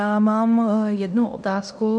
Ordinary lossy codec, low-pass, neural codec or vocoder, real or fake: MP3, 96 kbps; 9.9 kHz; codec, 16 kHz in and 24 kHz out, 0.9 kbps, LongCat-Audio-Codec, fine tuned four codebook decoder; fake